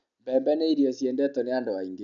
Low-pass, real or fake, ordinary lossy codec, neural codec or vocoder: 7.2 kHz; real; none; none